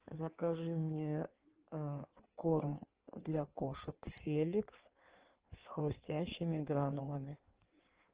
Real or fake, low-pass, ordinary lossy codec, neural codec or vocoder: fake; 3.6 kHz; Opus, 16 kbps; codec, 16 kHz in and 24 kHz out, 1.1 kbps, FireRedTTS-2 codec